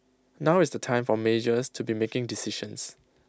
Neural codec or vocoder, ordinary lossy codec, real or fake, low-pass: none; none; real; none